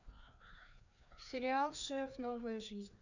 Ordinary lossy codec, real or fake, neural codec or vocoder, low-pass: none; fake; codec, 16 kHz, 2 kbps, FreqCodec, larger model; 7.2 kHz